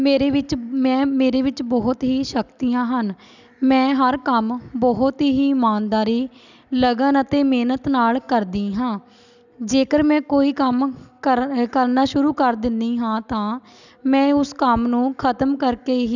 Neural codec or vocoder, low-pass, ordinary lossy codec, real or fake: none; 7.2 kHz; none; real